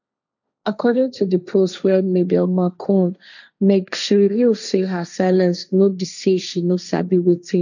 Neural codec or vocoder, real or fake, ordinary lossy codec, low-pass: codec, 16 kHz, 1.1 kbps, Voila-Tokenizer; fake; none; 7.2 kHz